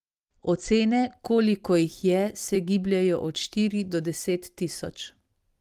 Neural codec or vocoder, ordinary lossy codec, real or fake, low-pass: vocoder, 44.1 kHz, 128 mel bands every 256 samples, BigVGAN v2; Opus, 24 kbps; fake; 14.4 kHz